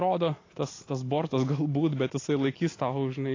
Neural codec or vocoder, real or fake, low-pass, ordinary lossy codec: none; real; 7.2 kHz; AAC, 32 kbps